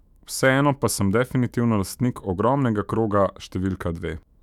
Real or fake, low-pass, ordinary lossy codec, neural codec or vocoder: fake; 19.8 kHz; none; autoencoder, 48 kHz, 128 numbers a frame, DAC-VAE, trained on Japanese speech